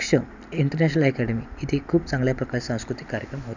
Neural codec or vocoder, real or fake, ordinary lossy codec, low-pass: none; real; none; 7.2 kHz